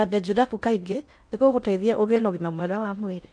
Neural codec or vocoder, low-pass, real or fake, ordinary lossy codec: codec, 16 kHz in and 24 kHz out, 0.8 kbps, FocalCodec, streaming, 65536 codes; 9.9 kHz; fake; MP3, 64 kbps